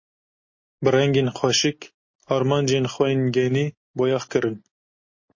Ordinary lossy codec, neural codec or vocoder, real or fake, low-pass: MP3, 32 kbps; none; real; 7.2 kHz